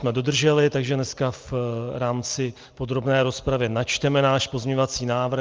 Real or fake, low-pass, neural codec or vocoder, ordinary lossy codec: real; 7.2 kHz; none; Opus, 24 kbps